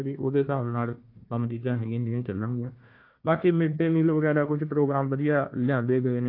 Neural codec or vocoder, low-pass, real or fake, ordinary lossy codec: codec, 16 kHz, 1 kbps, FunCodec, trained on Chinese and English, 50 frames a second; 5.4 kHz; fake; AAC, 32 kbps